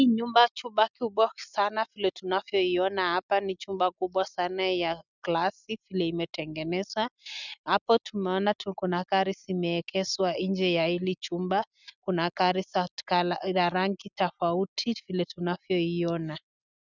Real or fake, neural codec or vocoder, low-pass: real; none; 7.2 kHz